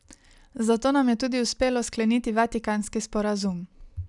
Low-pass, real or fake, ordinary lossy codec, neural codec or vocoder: 10.8 kHz; real; none; none